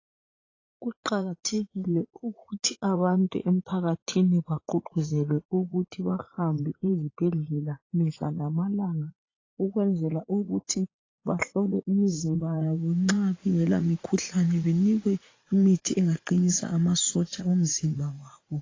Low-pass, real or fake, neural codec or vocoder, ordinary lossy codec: 7.2 kHz; fake; vocoder, 44.1 kHz, 80 mel bands, Vocos; AAC, 32 kbps